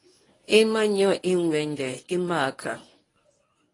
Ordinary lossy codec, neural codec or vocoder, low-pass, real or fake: AAC, 32 kbps; codec, 24 kHz, 0.9 kbps, WavTokenizer, medium speech release version 1; 10.8 kHz; fake